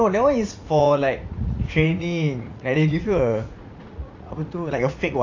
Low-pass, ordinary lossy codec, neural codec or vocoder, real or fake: 7.2 kHz; AAC, 48 kbps; vocoder, 44.1 kHz, 80 mel bands, Vocos; fake